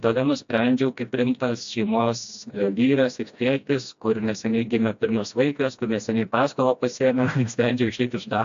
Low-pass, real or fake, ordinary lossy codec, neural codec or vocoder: 7.2 kHz; fake; AAC, 64 kbps; codec, 16 kHz, 1 kbps, FreqCodec, smaller model